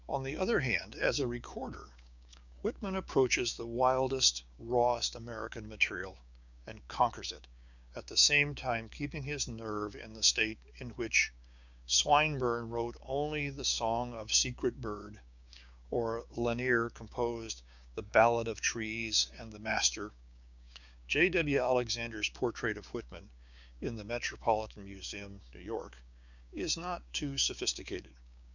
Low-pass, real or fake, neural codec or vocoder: 7.2 kHz; fake; codec, 16 kHz, 6 kbps, DAC